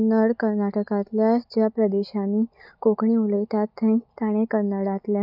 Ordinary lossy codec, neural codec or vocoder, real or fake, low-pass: none; none; real; 5.4 kHz